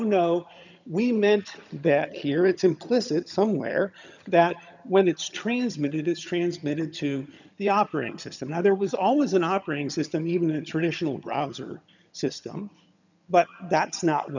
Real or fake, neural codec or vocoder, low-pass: fake; vocoder, 22.05 kHz, 80 mel bands, HiFi-GAN; 7.2 kHz